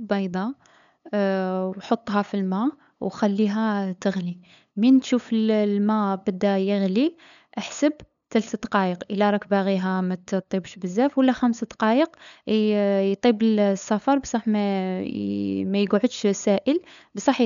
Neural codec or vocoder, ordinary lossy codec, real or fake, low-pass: codec, 16 kHz, 8 kbps, FunCodec, trained on LibriTTS, 25 frames a second; none; fake; 7.2 kHz